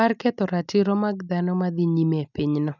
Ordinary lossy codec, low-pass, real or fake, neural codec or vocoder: none; 7.2 kHz; real; none